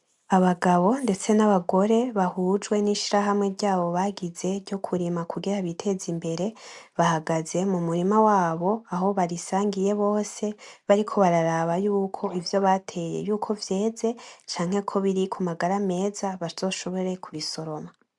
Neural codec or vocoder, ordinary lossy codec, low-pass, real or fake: none; MP3, 96 kbps; 10.8 kHz; real